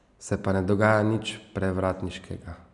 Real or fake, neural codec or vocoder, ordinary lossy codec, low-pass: fake; vocoder, 44.1 kHz, 128 mel bands every 256 samples, BigVGAN v2; none; 10.8 kHz